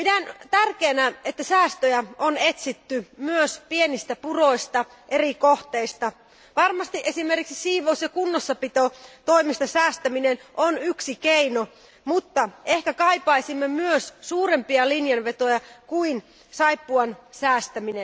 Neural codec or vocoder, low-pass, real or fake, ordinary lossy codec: none; none; real; none